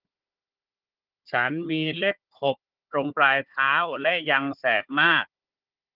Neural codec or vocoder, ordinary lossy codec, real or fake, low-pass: codec, 16 kHz, 4 kbps, FunCodec, trained on Chinese and English, 50 frames a second; Opus, 24 kbps; fake; 5.4 kHz